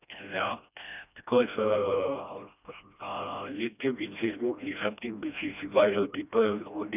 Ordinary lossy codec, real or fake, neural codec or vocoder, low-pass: none; fake; codec, 16 kHz, 1 kbps, FreqCodec, smaller model; 3.6 kHz